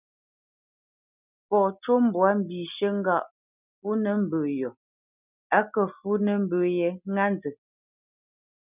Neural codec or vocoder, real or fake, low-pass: none; real; 3.6 kHz